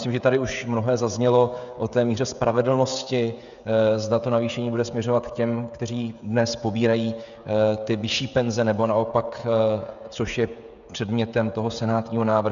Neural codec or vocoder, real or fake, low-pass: codec, 16 kHz, 16 kbps, FreqCodec, smaller model; fake; 7.2 kHz